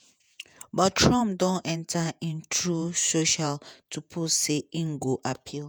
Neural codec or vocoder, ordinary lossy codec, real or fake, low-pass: vocoder, 48 kHz, 128 mel bands, Vocos; none; fake; none